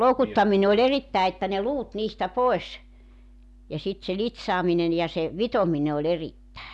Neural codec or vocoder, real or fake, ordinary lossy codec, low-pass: none; real; none; none